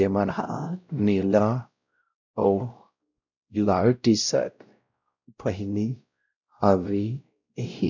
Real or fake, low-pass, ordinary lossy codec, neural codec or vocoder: fake; 7.2 kHz; none; codec, 16 kHz, 0.5 kbps, X-Codec, WavLM features, trained on Multilingual LibriSpeech